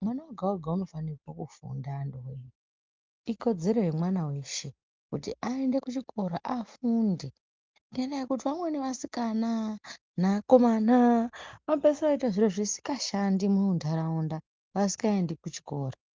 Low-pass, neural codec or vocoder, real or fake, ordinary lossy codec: 7.2 kHz; none; real; Opus, 32 kbps